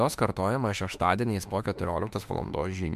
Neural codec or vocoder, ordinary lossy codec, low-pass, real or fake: autoencoder, 48 kHz, 32 numbers a frame, DAC-VAE, trained on Japanese speech; MP3, 96 kbps; 14.4 kHz; fake